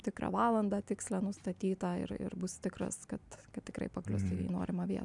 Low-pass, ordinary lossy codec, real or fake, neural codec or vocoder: 10.8 kHz; MP3, 96 kbps; real; none